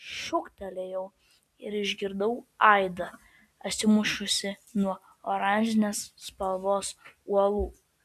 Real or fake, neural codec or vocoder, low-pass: real; none; 14.4 kHz